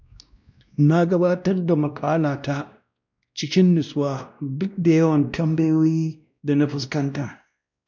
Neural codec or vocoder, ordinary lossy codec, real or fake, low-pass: codec, 16 kHz, 1 kbps, X-Codec, WavLM features, trained on Multilingual LibriSpeech; none; fake; 7.2 kHz